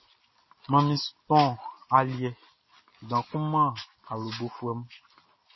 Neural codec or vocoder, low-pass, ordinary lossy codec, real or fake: none; 7.2 kHz; MP3, 24 kbps; real